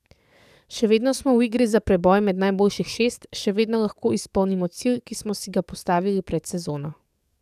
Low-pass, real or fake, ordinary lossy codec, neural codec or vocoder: 14.4 kHz; fake; none; codec, 44.1 kHz, 7.8 kbps, DAC